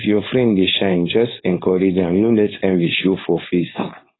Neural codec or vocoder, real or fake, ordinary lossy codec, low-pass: codec, 16 kHz, 4.8 kbps, FACodec; fake; AAC, 16 kbps; 7.2 kHz